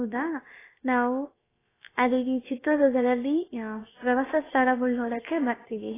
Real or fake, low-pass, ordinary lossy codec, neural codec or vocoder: fake; 3.6 kHz; AAC, 16 kbps; codec, 16 kHz, about 1 kbps, DyCAST, with the encoder's durations